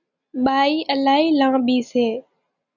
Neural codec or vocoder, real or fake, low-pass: none; real; 7.2 kHz